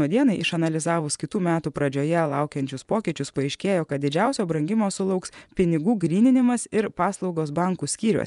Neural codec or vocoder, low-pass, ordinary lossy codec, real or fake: vocoder, 24 kHz, 100 mel bands, Vocos; 10.8 kHz; MP3, 96 kbps; fake